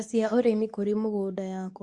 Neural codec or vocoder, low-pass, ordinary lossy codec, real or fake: codec, 24 kHz, 0.9 kbps, WavTokenizer, medium speech release version 2; 10.8 kHz; Opus, 64 kbps; fake